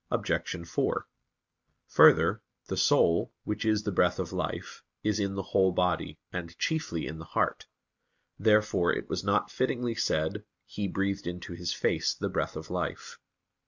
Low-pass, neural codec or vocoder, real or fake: 7.2 kHz; none; real